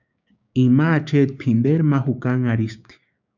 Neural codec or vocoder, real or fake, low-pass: codec, 16 kHz, 6 kbps, DAC; fake; 7.2 kHz